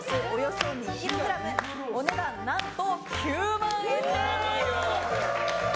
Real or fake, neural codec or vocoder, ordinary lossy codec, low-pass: real; none; none; none